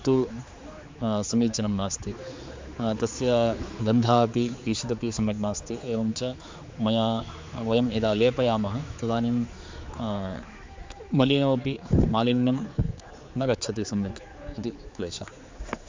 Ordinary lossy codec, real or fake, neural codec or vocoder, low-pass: MP3, 64 kbps; fake; codec, 16 kHz, 4 kbps, X-Codec, HuBERT features, trained on general audio; 7.2 kHz